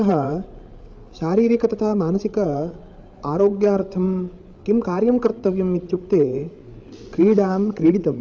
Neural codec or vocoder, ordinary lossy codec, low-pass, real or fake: codec, 16 kHz, 8 kbps, FreqCodec, larger model; none; none; fake